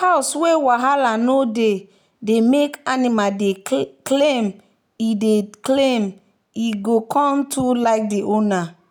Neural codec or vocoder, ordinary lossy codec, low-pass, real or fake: none; none; none; real